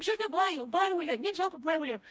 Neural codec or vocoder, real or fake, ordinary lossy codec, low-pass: codec, 16 kHz, 1 kbps, FreqCodec, smaller model; fake; none; none